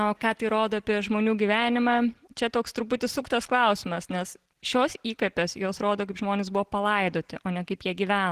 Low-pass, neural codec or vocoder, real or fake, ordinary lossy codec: 14.4 kHz; none; real; Opus, 16 kbps